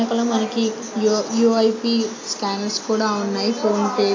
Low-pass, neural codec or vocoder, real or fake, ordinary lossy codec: 7.2 kHz; none; real; AAC, 32 kbps